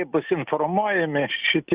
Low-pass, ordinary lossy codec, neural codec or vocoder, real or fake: 3.6 kHz; Opus, 64 kbps; none; real